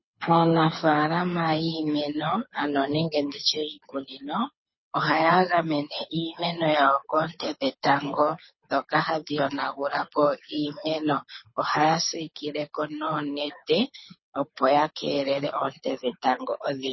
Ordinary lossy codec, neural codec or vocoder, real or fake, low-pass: MP3, 24 kbps; codec, 24 kHz, 6 kbps, HILCodec; fake; 7.2 kHz